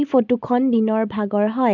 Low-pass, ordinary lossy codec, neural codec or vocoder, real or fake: 7.2 kHz; none; none; real